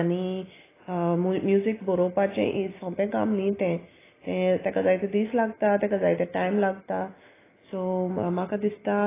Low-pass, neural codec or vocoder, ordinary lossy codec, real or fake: 3.6 kHz; none; AAC, 16 kbps; real